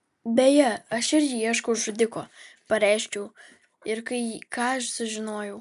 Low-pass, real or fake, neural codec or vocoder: 14.4 kHz; real; none